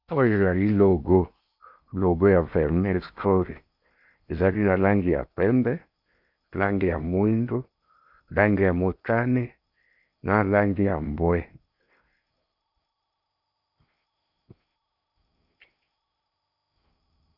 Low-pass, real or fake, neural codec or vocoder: 5.4 kHz; fake; codec, 16 kHz in and 24 kHz out, 0.8 kbps, FocalCodec, streaming, 65536 codes